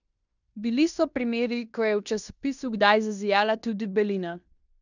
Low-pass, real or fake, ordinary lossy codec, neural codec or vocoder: 7.2 kHz; fake; none; codec, 16 kHz in and 24 kHz out, 0.9 kbps, LongCat-Audio-Codec, fine tuned four codebook decoder